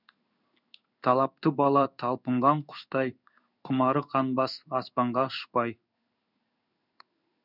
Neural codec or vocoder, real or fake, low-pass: codec, 16 kHz in and 24 kHz out, 1 kbps, XY-Tokenizer; fake; 5.4 kHz